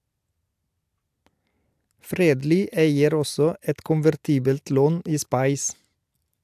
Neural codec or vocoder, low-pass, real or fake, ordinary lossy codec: none; 14.4 kHz; real; AAC, 96 kbps